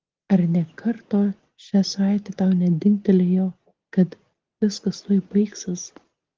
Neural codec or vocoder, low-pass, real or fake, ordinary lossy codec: none; 7.2 kHz; real; Opus, 16 kbps